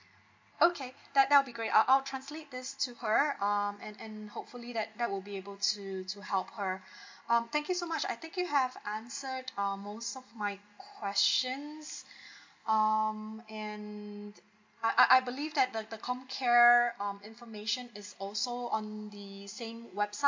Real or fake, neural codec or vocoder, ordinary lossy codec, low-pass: real; none; MP3, 48 kbps; 7.2 kHz